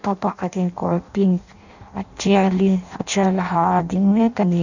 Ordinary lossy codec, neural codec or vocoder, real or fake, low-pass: none; codec, 16 kHz in and 24 kHz out, 0.6 kbps, FireRedTTS-2 codec; fake; 7.2 kHz